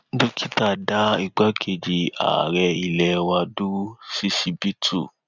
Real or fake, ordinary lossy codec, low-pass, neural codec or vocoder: real; none; 7.2 kHz; none